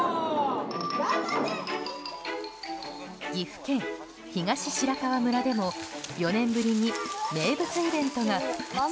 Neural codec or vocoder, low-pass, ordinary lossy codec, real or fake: none; none; none; real